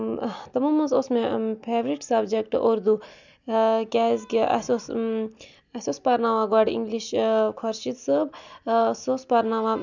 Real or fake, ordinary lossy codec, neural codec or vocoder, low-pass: real; none; none; 7.2 kHz